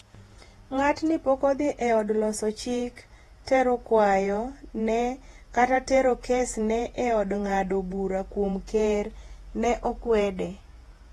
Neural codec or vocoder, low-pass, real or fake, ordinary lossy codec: vocoder, 48 kHz, 128 mel bands, Vocos; 19.8 kHz; fake; AAC, 32 kbps